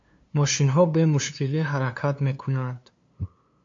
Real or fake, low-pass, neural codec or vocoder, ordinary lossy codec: fake; 7.2 kHz; codec, 16 kHz, 2 kbps, FunCodec, trained on LibriTTS, 25 frames a second; MP3, 48 kbps